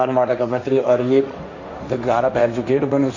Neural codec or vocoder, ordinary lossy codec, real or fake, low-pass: codec, 16 kHz, 1.1 kbps, Voila-Tokenizer; AAC, 48 kbps; fake; 7.2 kHz